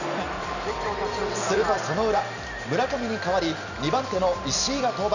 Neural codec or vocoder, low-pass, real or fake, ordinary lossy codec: none; 7.2 kHz; real; none